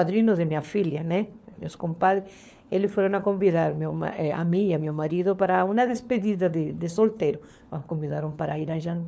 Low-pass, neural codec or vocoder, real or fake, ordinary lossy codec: none; codec, 16 kHz, 4 kbps, FunCodec, trained on LibriTTS, 50 frames a second; fake; none